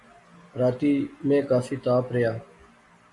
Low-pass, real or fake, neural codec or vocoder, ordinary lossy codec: 10.8 kHz; real; none; MP3, 48 kbps